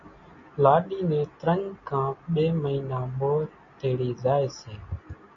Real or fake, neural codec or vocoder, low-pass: real; none; 7.2 kHz